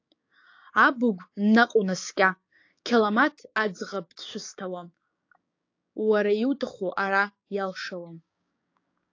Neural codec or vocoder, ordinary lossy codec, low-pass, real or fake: codec, 16 kHz, 6 kbps, DAC; AAC, 48 kbps; 7.2 kHz; fake